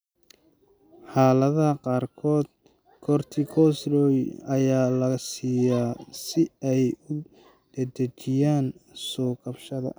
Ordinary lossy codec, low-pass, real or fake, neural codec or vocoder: none; none; real; none